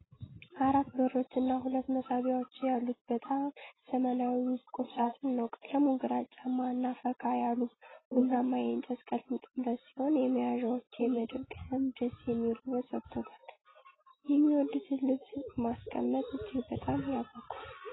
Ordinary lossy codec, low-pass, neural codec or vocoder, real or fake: AAC, 16 kbps; 7.2 kHz; none; real